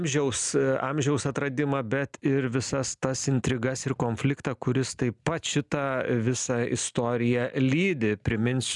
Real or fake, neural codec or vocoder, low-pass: real; none; 10.8 kHz